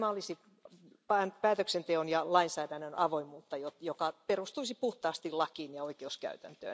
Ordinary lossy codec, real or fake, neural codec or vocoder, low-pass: none; real; none; none